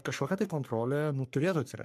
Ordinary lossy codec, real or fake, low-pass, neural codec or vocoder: MP3, 96 kbps; fake; 14.4 kHz; codec, 44.1 kHz, 3.4 kbps, Pupu-Codec